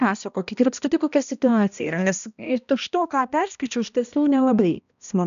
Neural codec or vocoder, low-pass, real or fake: codec, 16 kHz, 1 kbps, X-Codec, HuBERT features, trained on balanced general audio; 7.2 kHz; fake